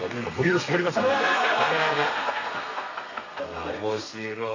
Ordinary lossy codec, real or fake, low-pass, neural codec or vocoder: AAC, 32 kbps; fake; 7.2 kHz; codec, 32 kHz, 1.9 kbps, SNAC